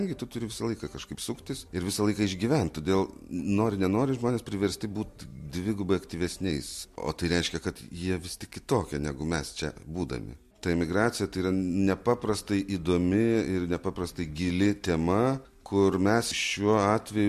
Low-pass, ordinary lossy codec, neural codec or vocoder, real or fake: 14.4 kHz; MP3, 64 kbps; none; real